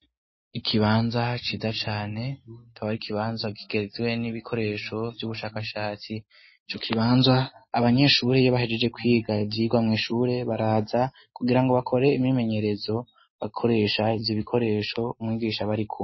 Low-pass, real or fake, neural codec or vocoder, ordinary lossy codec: 7.2 kHz; real; none; MP3, 24 kbps